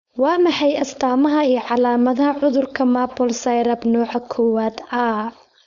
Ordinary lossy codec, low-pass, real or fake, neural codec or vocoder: none; 7.2 kHz; fake; codec, 16 kHz, 4.8 kbps, FACodec